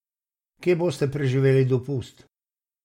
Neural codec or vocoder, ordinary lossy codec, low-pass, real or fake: none; MP3, 64 kbps; 19.8 kHz; real